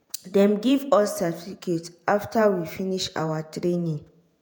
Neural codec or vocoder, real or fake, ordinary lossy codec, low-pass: vocoder, 48 kHz, 128 mel bands, Vocos; fake; none; none